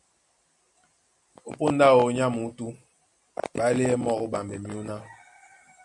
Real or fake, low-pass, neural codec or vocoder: real; 10.8 kHz; none